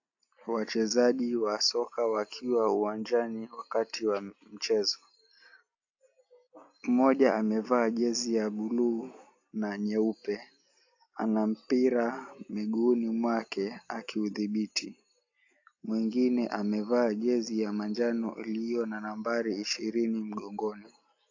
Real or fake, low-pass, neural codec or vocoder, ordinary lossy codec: real; 7.2 kHz; none; AAC, 48 kbps